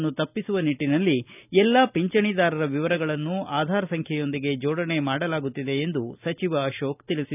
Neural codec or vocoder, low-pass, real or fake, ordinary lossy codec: none; 3.6 kHz; real; none